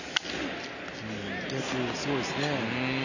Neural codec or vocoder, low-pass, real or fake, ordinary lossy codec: none; 7.2 kHz; real; none